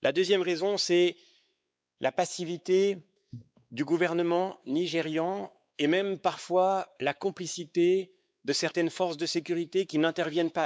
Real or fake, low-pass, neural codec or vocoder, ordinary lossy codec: fake; none; codec, 16 kHz, 4 kbps, X-Codec, WavLM features, trained on Multilingual LibriSpeech; none